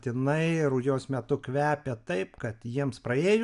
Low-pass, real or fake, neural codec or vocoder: 10.8 kHz; real; none